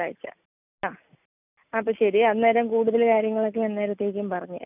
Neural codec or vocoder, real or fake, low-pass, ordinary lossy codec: none; real; 3.6 kHz; none